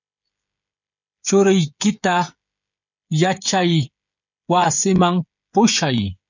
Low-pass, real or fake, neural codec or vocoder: 7.2 kHz; fake; codec, 16 kHz, 16 kbps, FreqCodec, smaller model